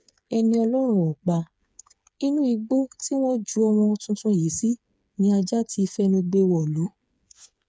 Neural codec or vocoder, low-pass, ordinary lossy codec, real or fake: codec, 16 kHz, 8 kbps, FreqCodec, smaller model; none; none; fake